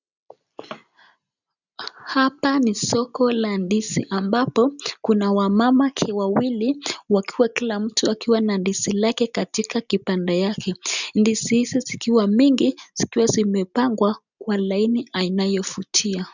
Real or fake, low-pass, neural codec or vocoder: real; 7.2 kHz; none